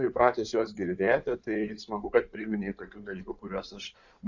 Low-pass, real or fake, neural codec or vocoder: 7.2 kHz; fake; codec, 16 kHz in and 24 kHz out, 2.2 kbps, FireRedTTS-2 codec